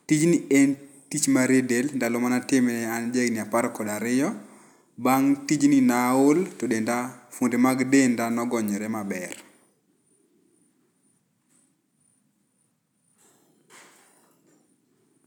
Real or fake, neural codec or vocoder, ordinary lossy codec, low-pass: real; none; none; 19.8 kHz